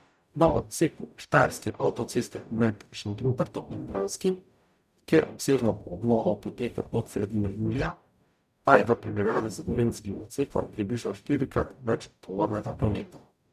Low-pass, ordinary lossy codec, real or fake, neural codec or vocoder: 14.4 kHz; none; fake; codec, 44.1 kHz, 0.9 kbps, DAC